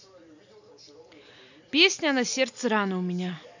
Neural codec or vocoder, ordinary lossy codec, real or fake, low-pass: none; none; real; 7.2 kHz